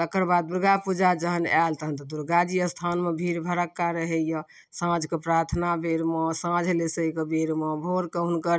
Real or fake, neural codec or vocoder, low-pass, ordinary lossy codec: real; none; none; none